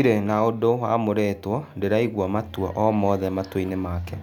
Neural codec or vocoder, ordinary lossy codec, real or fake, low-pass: none; none; real; 19.8 kHz